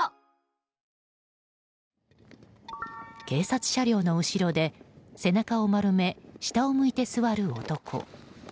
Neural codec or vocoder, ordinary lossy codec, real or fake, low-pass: none; none; real; none